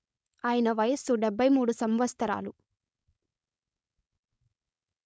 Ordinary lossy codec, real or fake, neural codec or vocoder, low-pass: none; fake; codec, 16 kHz, 4.8 kbps, FACodec; none